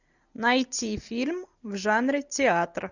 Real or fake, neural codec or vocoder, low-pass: real; none; 7.2 kHz